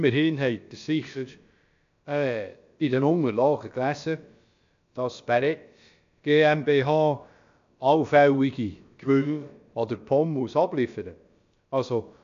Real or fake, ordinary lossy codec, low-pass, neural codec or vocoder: fake; AAC, 64 kbps; 7.2 kHz; codec, 16 kHz, about 1 kbps, DyCAST, with the encoder's durations